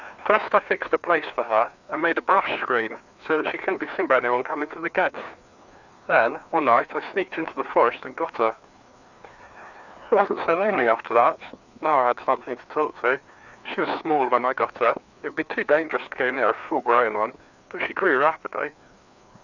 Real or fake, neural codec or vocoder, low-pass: fake; codec, 16 kHz, 2 kbps, FreqCodec, larger model; 7.2 kHz